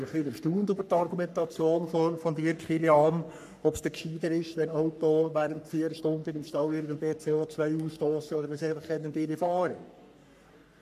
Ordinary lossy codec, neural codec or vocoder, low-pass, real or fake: none; codec, 44.1 kHz, 3.4 kbps, Pupu-Codec; 14.4 kHz; fake